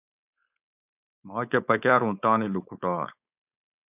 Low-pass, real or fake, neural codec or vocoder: 3.6 kHz; fake; codec, 16 kHz, 4.8 kbps, FACodec